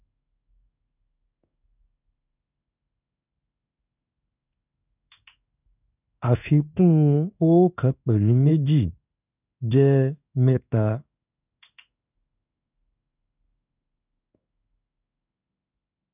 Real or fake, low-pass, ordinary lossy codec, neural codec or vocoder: fake; 3.6 kHz; none; codec, 16 kHz in and 24 kHz out, 1 kbps, XY-Tokenizer